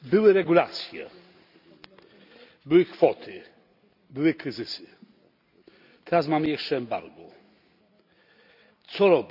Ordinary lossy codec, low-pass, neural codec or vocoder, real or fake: none; 5.4 kHz; none; real